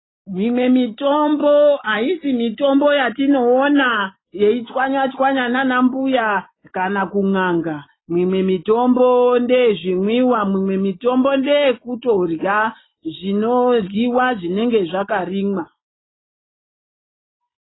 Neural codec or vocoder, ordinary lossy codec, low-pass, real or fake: none; AAC, 16 kbps; 7.2 kHz; real